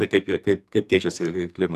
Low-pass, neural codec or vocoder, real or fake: 14.4 kHz; codec, 44.1 kHz, 2.6 kbps, SNAC; fake